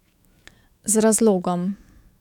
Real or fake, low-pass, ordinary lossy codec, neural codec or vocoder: fake; 19.8 kHz; none; autoencoder, 48 kHz, 128 numbers a frame, DAC-VAE, trained on Japanese speech